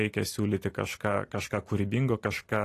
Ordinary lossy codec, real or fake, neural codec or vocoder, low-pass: AAC, 48 kbps; real; none; 14.4 kHz